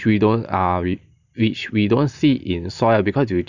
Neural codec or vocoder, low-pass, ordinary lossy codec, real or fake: none; 7.2 kHz; none; real